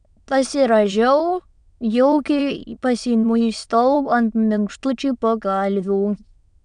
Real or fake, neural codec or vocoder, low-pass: fake; autoencoder, 22.05 kHz, a latent of 192 numbers a frame, VITS, trained on many speakers; 9.9 kHz